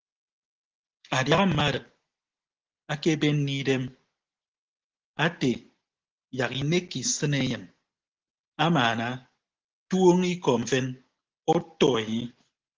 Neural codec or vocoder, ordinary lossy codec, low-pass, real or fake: none; Opus, 16 kbps; 7.2 kHz; real